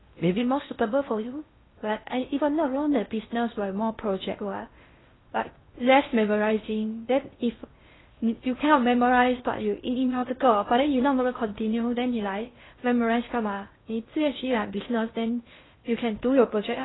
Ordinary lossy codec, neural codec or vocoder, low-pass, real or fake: AAC, 16 kbps; codec, 16 kHz in and 24 kHz out, 0.6 kbps, FocalCodec, streaming, 4096 codes; 7.2 kHz; fake